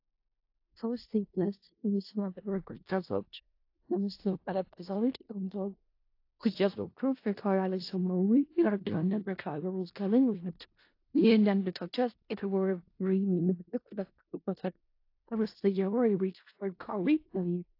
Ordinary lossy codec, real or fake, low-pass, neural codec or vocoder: AAC, 32 kbps; fake; 5.4 kHz; codec, 16 kHz in and 24 kHz out, 0.4 kbps, LongCat-Audio-Codec, four codebook decoder